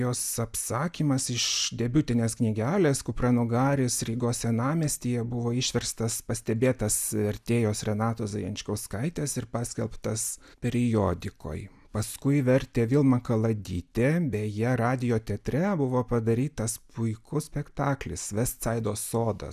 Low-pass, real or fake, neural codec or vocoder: 14.4 kHz; real; none